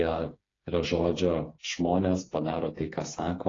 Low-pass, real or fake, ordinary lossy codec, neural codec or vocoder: 7.2 kHz; fake; AAC, 32 kbps; codec, 16 kHz, 4 kbps, FreqCodec, smaller model